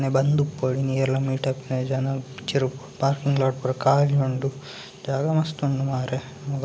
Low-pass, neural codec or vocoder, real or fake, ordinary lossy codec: none; none; real; none